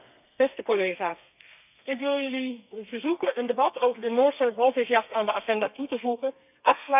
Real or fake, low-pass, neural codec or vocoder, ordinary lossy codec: fake; 3.6 kHz; codec, 32 kHz, 1.9 kbps, SNAC; none